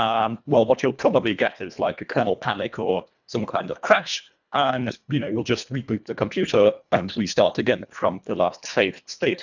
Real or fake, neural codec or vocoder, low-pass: fake; codec, 24 kHz, 1.5 kbps, HILCodec; 7.2 kHz